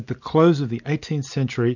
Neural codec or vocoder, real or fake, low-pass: none; real; 7.2 kHz